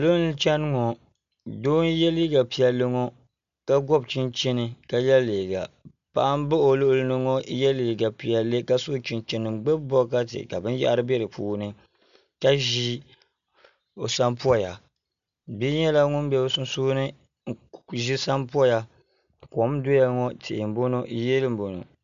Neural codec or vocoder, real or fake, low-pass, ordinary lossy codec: none; real; 7.2 kHz; MP3, 64 kbps